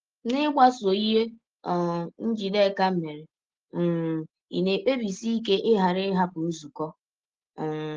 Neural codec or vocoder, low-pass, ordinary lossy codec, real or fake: none; 7.2 kHz; Opus, 16 kbps; real